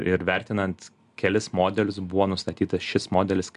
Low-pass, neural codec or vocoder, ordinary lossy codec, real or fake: 10.8 kHz; none; MP3, 96 kbps; real